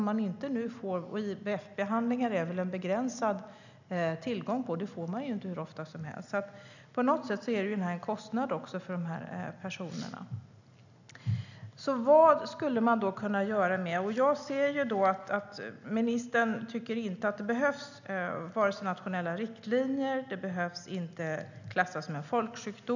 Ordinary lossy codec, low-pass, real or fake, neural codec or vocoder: none; 7.2 kHz; real; none